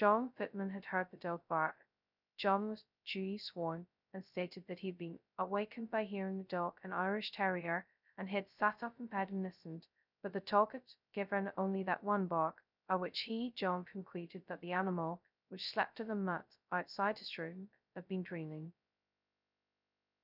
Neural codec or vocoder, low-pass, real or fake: codec, 16 kHz, 0.2 kbps, FocalCodec; 5.4 kHz; fake